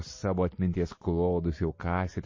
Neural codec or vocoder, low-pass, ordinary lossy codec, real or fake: none; 7.2 kHz; MP3, 32 kbps; real